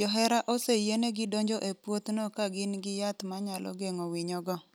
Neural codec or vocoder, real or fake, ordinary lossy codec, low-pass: none; real; none; none